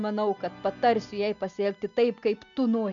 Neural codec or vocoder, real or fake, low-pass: none; real; 7.2 kHz